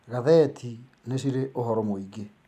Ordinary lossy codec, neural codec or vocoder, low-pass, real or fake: none; none; 14.4 kHz; real